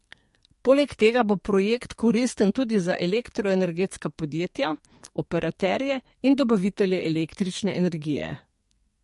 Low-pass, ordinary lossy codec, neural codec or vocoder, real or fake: 14.4 kHz; MP3, 48 kbps; codec, 44.1 kHz, 2.6 kbps, SNAC; fake